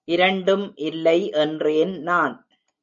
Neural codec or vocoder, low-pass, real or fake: none; 7.2 kHz; real